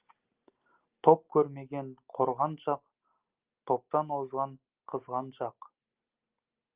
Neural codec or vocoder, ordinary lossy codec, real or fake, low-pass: none; Opus, 32 kbps; real; 3.6 kHz